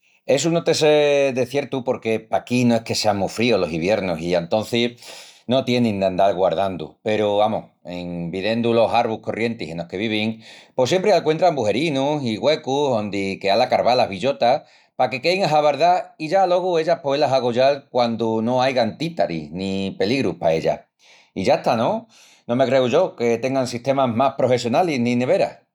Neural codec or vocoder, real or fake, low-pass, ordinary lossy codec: none; real; 19.8 kHz; none